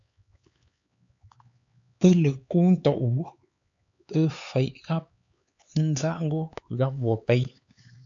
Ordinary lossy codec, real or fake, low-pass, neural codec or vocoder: AAC, 64 kbps; fake; 7.2 kHz; codec, 16 kHz, 4 kbps, X-Codec, HuBERT features, trained on LibriSpeech